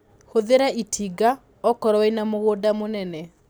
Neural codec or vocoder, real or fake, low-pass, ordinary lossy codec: none; real; none; none